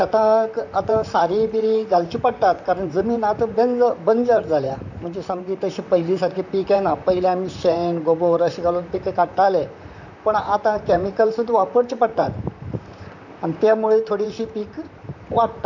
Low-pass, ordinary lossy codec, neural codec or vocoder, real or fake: 7.2 kHz; none; vocoder, 44.1 kHz, 128 mel bands, Pupu-Vocoder; fake